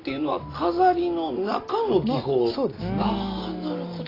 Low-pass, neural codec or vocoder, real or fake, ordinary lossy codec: 5.4 kHz; none; real; AAC, 24 kbps